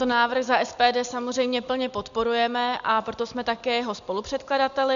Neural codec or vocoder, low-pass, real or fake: none; 7.2 kHz; real